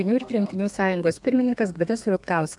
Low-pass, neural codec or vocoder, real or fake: 10.8 kHz; codec, 32 kHz, 1.9 kbps, SNAC; fake